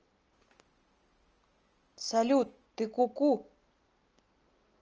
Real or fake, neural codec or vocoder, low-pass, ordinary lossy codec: real; none; 7.2 kHz; Opus, 24 kbps